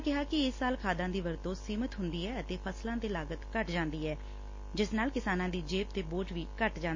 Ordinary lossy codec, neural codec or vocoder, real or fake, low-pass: MP3, 32 kbps; none; real; 7.2 kHz